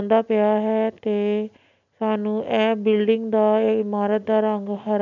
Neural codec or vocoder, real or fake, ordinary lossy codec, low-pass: none; real; none; 7.2 kHz